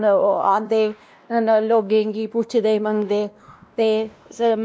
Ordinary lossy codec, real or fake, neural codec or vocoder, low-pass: none; fake; codec, 16 kHz, 1 kbps, X-Codec, WavLM features, trained on Multilingual LibriSpeech; none